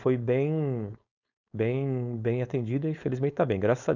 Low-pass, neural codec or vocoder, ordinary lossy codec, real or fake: 7.2 kHz; codec, 16 kHz, 4.8 kbps, FACodec; none; fake